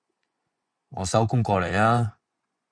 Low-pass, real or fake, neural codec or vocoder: 9.9 kHz; fake; vocoder, 24 kHz, 100 mel bands, Vocos